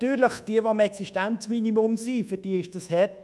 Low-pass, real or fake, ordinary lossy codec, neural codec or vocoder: none; fake; none; codec, 24 kHz, 1.2 kbps, DualCodec